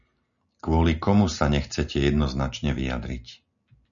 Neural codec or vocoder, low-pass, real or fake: none; 7.2 kHz; real